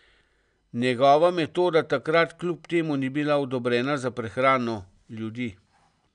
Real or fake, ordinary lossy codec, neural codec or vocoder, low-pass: real; none; none; 9.9 kHz